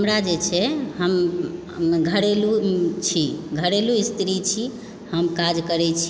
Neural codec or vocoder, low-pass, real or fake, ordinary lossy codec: none; none; real; none